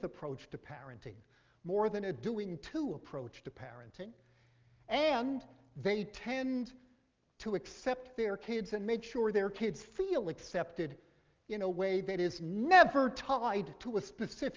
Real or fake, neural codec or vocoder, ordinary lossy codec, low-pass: real; none; Opus, 32 kbps; 7.2 kHz